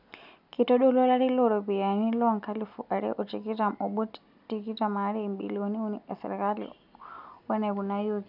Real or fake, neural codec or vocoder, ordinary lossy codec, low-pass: real; none; none; 5.4 kHz